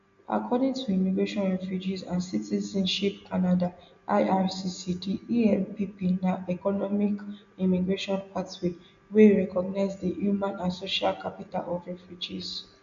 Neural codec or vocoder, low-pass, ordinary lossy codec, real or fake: none; 7.2 kHz; AAC, 64 kbps; real